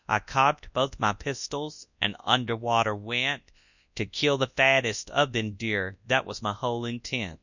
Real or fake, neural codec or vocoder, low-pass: fake; codec, 24 kHz, 0.9 kbps, WavTokenizer, large speech release; 7.2 kHz